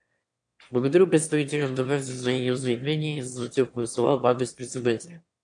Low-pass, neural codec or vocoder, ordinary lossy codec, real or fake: 9.9 kHz; autoencoder, 22.05 kHz, a latent of 192 numbers a frame, VITS, trained on one speaker; AAC, 64 kbps; fake